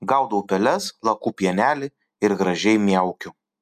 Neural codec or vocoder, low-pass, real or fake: none; 14.4 kHz; real